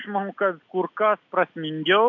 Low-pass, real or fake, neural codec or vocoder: 7.2 kHz; real; none